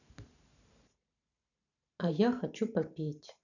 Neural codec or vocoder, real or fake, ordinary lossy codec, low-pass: vocoder, 44.1 kHz, 128 mel bands every 512 samples, BigVGAN v2; fake; none; 7.2 kHz